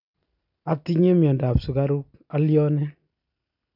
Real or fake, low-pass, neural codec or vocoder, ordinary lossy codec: real; 5.4 kHz; none; none